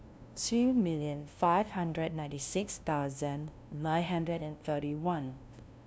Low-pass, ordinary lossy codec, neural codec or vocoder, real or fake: none; none; codec, 16 kHz, 0.5 kbps, FunCodec, trained on LibriTTS, 25 frames a second; fake